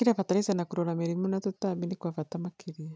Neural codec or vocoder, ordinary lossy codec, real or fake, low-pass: none; none; real; none